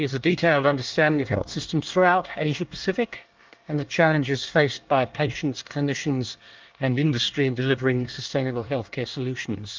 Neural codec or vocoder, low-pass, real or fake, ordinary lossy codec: codec, 24 kHz, 1 kbps, SNAC; 7.2 kHz; fake; Opus, 32 kbps